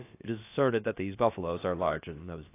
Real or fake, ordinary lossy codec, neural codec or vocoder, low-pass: fake; AAC, 24 kbps; codec, 16 kHz, about 1 kbps, DyCAST, with the encoder's durations; 3.6 kHz